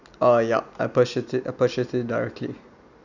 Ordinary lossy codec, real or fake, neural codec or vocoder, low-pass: none; real; none; 7.2 kHz